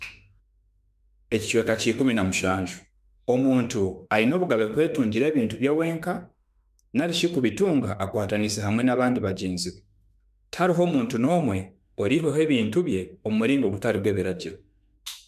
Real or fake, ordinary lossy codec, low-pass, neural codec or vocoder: fake; none; 14.4 kHz; autoencoder, 48 kHz, 32 numbers a frame, DAC-VAE, trained on Japanese speech